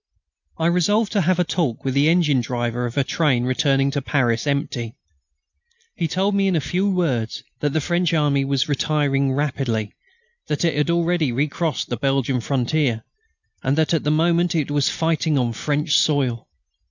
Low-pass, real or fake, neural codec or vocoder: 7.2 kHz; real; none